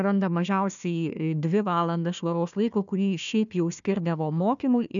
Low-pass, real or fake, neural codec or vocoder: 7.2 kHz; fake; codec, 16 kHz, 1 kbps, FunCodec, trained on Chinese and English, 50 frames a second